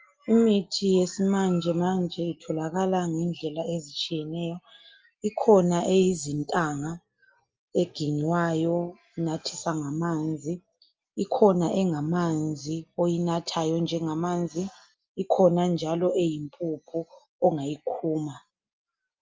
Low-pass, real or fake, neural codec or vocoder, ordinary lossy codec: 7.2 kHz; real; none; Opus, 24 kbps